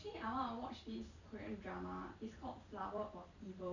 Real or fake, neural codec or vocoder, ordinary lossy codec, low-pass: fake; vocoder, 44.1 kHz, 128 mel bands every 256 samples, BigVGAN v2; none; 7.2 kHz